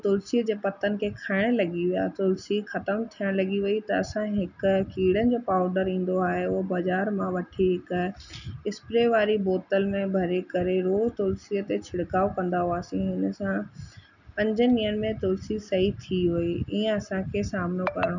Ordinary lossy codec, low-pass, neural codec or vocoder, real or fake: none; 7.2 kHz; none; real